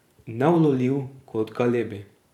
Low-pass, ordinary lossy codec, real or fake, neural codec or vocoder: 19.8 kHz; none; fake; vocoder, 44.1 kHz, 128 mel bands every 512 samples, BigVGAN v2